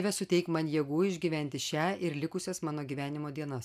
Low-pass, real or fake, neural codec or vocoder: 14.4 kHz; real; none